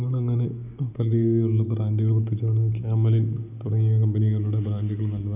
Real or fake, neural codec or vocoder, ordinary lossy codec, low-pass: real; none; none; 3.6 kHz